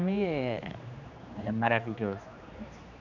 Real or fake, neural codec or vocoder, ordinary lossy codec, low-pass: fake; codec, 16 kHz, 2 kbps, X-Codec, HuBERT features, trained on general audio; none; 7.2 kHz